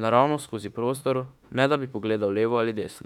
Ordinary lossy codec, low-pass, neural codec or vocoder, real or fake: none; 19.8 kHz; autoencoder, 48 kHz, 32 numbers a frame, DAC-VAE, trained on Japanese speech; fake